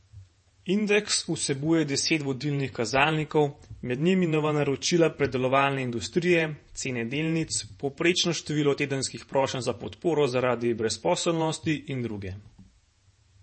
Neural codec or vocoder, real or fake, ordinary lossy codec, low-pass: vocoder, 48 kHz, 128 mel bands, Vocos; fake; MP3, 32 kbps; 10.8 kHz